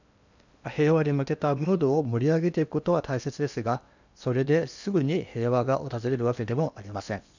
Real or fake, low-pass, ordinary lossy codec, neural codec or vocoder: fake; 7.2 kHz; none; codec, 16 kHz in and 24 kHz out, 0.8 kbps, FocalCodec, streaming, 65536 codes